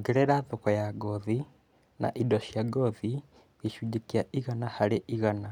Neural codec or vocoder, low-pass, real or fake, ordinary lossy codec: none; 19.8 kHz; real; none